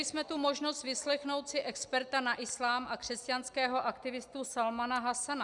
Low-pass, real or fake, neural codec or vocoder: 10.8 kHz; real; none